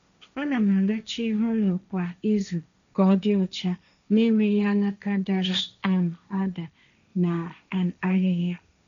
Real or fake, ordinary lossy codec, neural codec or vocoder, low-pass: fake; none; codec, 16 kHz, 1.1 kbps, Voila-Tokenizer; 7.2 kHz